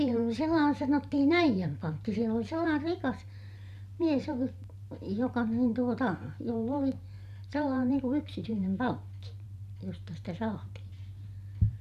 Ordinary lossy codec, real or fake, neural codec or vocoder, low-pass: none; fake; vocoder, 44.1 kHz, 128 mel bands every 512 samples, BigVGAN v2; 14.4 kHz